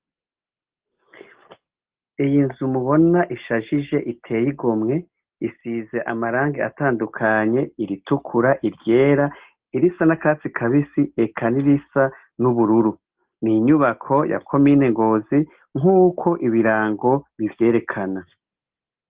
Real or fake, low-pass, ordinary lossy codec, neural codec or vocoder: real; 3.6 kHz; Opus, 16 kbps; none